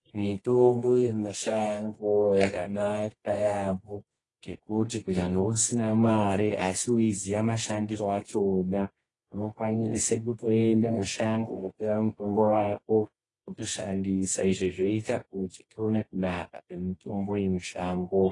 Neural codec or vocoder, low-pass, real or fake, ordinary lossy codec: codec, 24 kHz, 0.9 kbps, WavTokenizer, medium music audio release; 10.8 kHz; fake; AAC, 32 kbps